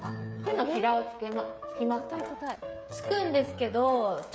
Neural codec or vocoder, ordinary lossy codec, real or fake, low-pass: codec, 16 kHz, 16 kbps, FreqCodec, smaller model; none; fake; none